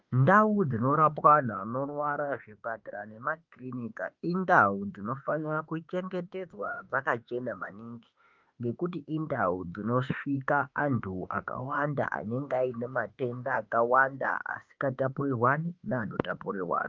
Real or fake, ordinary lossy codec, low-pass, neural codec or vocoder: fake; Opus, 32 kbps; 7.2 kHz; autoencoder, 48 kHz, 32 numbers a frame, DAC-VAE, trained on Japanese speech